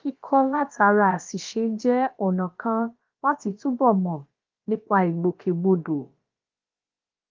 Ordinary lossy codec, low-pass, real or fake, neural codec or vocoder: Opus, 32 kbps; 7.2 kHz; fake; codec, 16 kHz, about 1 kbps, DyCAST, with the encoder's durations